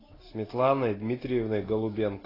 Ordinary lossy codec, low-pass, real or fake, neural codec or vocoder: AAC, 24 kbps; 5.4 kHz; real; none